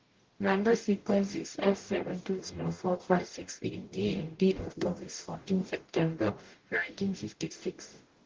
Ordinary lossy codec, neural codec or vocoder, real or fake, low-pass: Opus, 16 kbps; codec, 44.1 kHz, 0.9 kbps, DAC; fake; 7.2 kHz